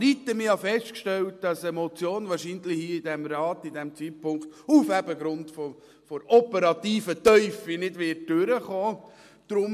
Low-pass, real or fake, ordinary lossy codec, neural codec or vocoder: 14.4 kHz; real; MP3, 64 kbps; none